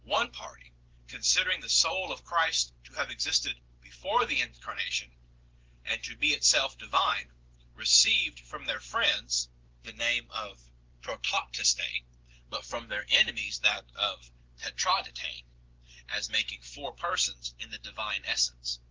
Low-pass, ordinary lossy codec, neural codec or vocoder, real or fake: 7.2 kHz; Opus, 16 kbps; none; real